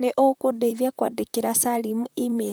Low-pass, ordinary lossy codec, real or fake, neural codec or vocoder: none; none; fake; vocoder, 44.1 kHz, 128 mel bands, Pupu-Vocoder